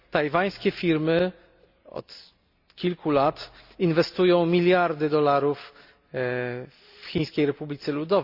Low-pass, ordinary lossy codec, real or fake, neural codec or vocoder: 5.4 kHz; Opus, 64 kbps; real; none